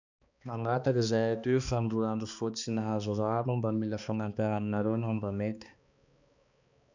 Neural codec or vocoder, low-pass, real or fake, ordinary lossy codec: codec, 16 kHz, 2 kbps, X-Codec, HuBERT features, trained on balanced general audio; 7.2 kHz; fake; none